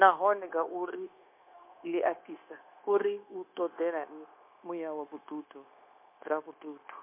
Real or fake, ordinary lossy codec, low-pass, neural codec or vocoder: fake; MP3, 24 kbps; 3.6 kHz; codec, 16 kHz, 0.9 kbps, LongCat-Audio-Codec